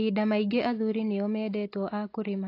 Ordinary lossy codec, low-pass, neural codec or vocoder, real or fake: AAC, 32 kbps; 5.4 kHz; none; real